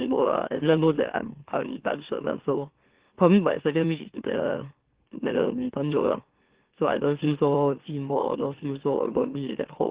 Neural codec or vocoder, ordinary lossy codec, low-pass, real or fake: autoencoder, 44.1 kHz, a latent of 192 numbers a frame, MeloTTS; Opus, 16 kbps; 3.6 kHz; fake